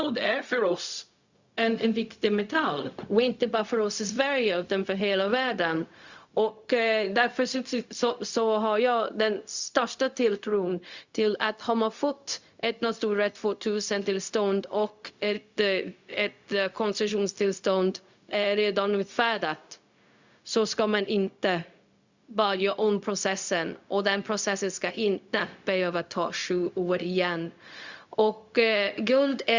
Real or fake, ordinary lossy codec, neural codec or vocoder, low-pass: fake; Opus, 64 kbps; codec, 16 kHz, 0.4 kbps, LongCat-Audio-Codec; 7.2 kHz